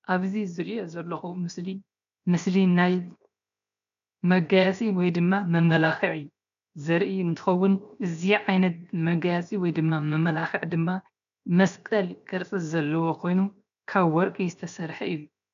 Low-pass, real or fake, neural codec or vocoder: 7.2 kHz; fake; codec, 16 kHz, 0.7 kbps, FocalCodec